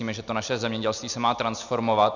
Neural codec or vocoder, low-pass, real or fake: none; 7.2 kHz; real